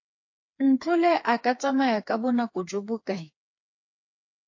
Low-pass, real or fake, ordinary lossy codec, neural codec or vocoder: 7.2 kHz; fake; AAC, 48 kbps; codec, 16 kHz, 4 kbps, FreqCodec, smaller model